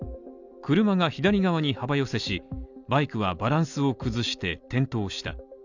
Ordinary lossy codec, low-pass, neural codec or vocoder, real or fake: none; 7.2 kHz; none; real